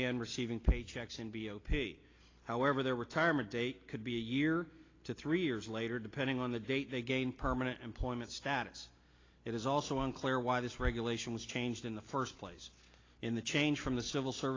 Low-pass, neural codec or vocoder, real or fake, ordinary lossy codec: 7.2 kHz; none; real; AAC, 32 kbps